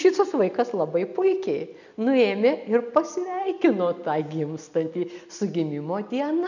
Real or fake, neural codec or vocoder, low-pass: real; none; 7.2 kHz